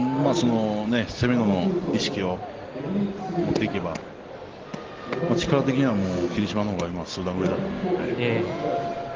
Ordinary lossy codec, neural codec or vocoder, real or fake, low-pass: Opus, 16 kbps; none; real; 7.2 kHz